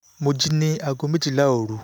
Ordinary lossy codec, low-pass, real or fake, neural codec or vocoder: none; none; real; none